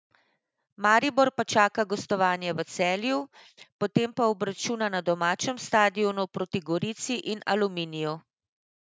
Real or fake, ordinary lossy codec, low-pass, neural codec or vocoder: real; none; none; none